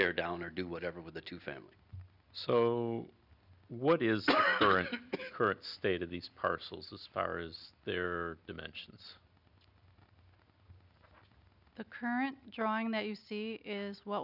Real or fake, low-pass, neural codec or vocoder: real; 5.4 kHz; none